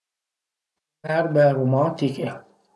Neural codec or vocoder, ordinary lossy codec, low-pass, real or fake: none; none; none; real